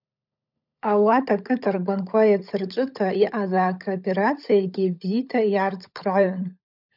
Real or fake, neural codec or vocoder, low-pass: fake; codec, 16 kHz, 16 kbps, FunCodec, trained on LibriTTS, 50 frames a second; 5.4 kHz